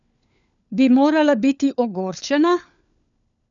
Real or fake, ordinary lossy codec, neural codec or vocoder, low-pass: fake; none; codec, 16 kHz, 4 kbps, FunCodec, trained on LibriTTS, 50 frames a second; 7.2 kHz